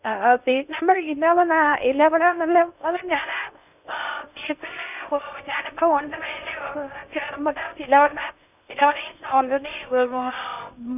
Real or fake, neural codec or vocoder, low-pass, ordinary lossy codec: fake; codec, 16 kHz in and 24 kHz out, 0.6 kbps, FocalCodec, streaming, 2048 codes; 3.6 kHz; none